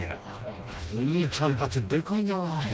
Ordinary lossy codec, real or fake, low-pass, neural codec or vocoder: none; fake; none; codec, 16 kHz, 1 kbps, FreqCodec, smaller model